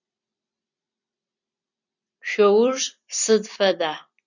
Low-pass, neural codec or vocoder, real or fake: 7.2 kHz; vocoder, 44.1 kHz, 128 mel bands every 256 samples, BigVGAN v2; fake